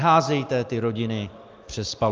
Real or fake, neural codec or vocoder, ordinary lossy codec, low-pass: real; none; Opus, 32 kbps; 7.2 kHz